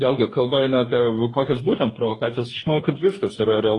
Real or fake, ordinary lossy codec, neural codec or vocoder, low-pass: fake; AAC, 32 kbps; codec, 44.1 kHz, 2.6 kbps, DAC; 10.8 kHz